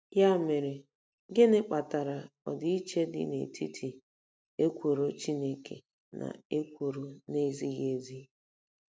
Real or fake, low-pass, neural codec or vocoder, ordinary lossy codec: real; none; none; none